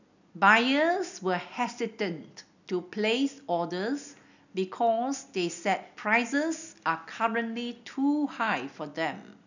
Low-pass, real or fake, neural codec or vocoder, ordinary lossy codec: 7.2 kHz; real; none; none